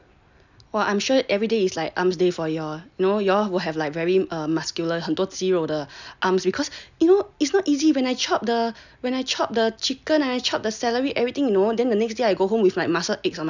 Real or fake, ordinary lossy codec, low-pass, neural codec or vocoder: real; none; 7.2 kHz; none